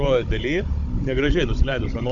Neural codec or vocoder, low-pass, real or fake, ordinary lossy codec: codec, 16 kHz, 16 kbps, FunCodec, trained on Chinese and English, 50 frames a second; 7.2 kHz; fake; MP3, 64 kbps